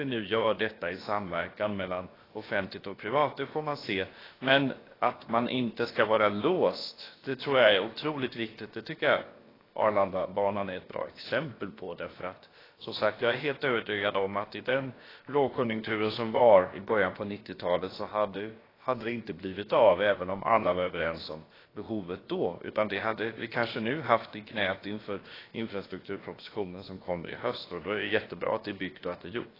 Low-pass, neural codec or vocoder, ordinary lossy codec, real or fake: 5.4 kHz; codec, 16 kHz, about 1 kbps, DyCAST, with the encoder's durations; AAC, 24 kbps; fake